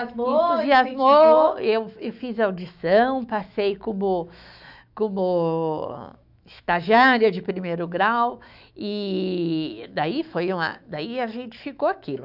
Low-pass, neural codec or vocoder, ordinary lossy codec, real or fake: 5.4 kHz; autoencoder, 48 kHz, 128 numbers a frame, DAC-VAE, trained on Japanese speech; none; fake